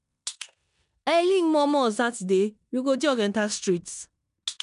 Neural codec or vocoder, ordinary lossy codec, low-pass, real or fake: codec, 16 kHz in and 24 kHz out, 0.9 kbps, LongCat-Audio-Codec, four codebook decoder; none; 10.8 kHz; fake